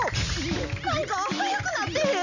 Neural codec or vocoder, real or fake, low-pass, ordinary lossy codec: none; real; 7.2 kHz; none